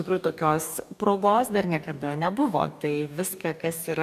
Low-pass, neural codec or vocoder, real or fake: 14.4 kHz; codec, 44.1 kHz, 2.6 kbps, DAC; fake